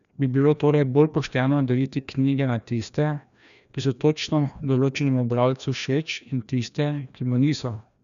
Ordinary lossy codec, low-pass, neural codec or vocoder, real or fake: none; 7.2 kHz; codec, 16 kHz, 1 kbps, FreqCodec, larger model; fake